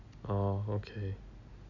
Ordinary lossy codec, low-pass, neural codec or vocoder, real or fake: MP3, 64 kbps; 7.2 kHz; none; real